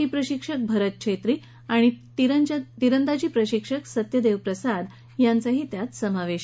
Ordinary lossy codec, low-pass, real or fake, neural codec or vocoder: none; none; real; none